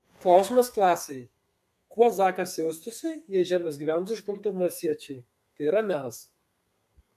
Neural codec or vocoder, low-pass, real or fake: codec, 32 kHz, 1.9 kbps, SNAC; 14.4 kHz; fake